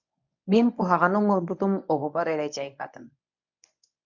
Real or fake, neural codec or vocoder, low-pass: fake; codec, 24 kHz, 0.9 kbps, WavTokenizer, medium speech release version 1; 7.2 kHz